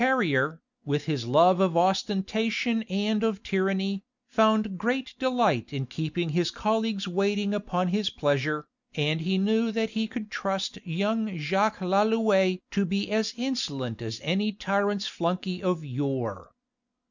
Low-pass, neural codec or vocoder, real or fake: 7.2 kHz; none; real